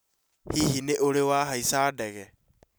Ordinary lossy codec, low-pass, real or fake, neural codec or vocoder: none; none; real; none